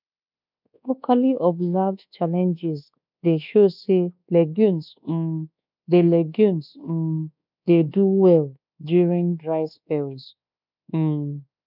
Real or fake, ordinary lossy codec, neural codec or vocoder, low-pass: fake; none; codec, 24 kHz, 1.2 kbps, DualCodec; 5.4 kHz